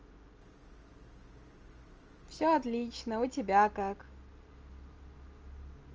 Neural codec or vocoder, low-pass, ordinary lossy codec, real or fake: none; 7.2 kHz; Opus, 24 kbps; real